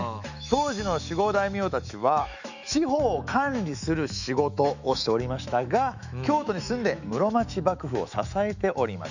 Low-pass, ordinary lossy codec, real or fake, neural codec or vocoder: 7.2 kHz; none; fake; autoencoder, 48 kHz, 128 numbers a frame, DAC-VAE, trained on Japanese speech